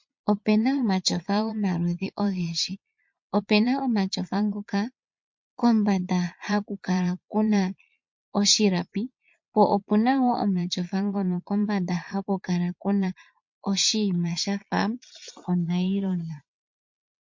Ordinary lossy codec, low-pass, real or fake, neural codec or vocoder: MP3, 48 kbps; 7.2 kHz; fake; vocoder, 22.05 kHz, 80 mel bands, Vocos